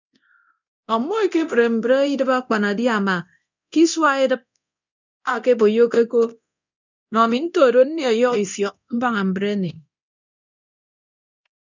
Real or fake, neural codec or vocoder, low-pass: fake; codec, 24 kHz, 0.9 kbps, DualCodec; 7.2 kHz